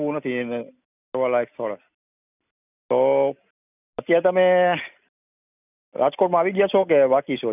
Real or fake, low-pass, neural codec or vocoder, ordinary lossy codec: real; 3.6 kHz; none; none